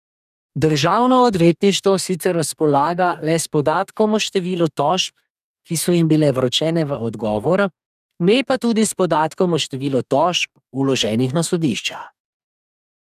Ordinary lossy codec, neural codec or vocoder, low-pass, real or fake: none; codec, 44.1 kHz, 2.6 kbps, DAC; 14.4 kHz; fake